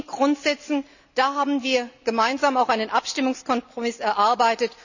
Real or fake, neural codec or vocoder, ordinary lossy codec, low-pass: real; none; none; 7.2 kHz